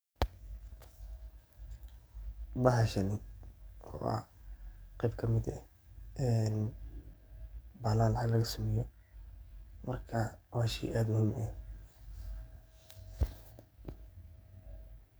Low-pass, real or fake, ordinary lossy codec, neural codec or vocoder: none; fake; none; codec, 44.1 kHz, 7.8 kbps, DAC